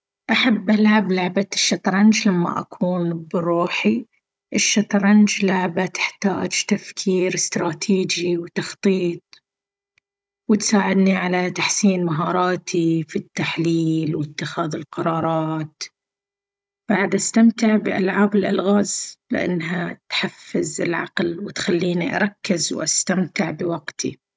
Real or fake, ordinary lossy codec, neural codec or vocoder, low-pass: fake; none; codec, 16 kHz, 16 kbps, FunCodec, trained on Chinese and English, 50 frames a second; none